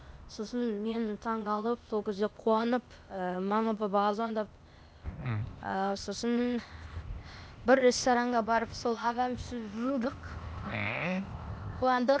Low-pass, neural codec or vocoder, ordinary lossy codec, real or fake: none; codec, 16 kHz, 0.8 kbps, ZipCodec; none; fake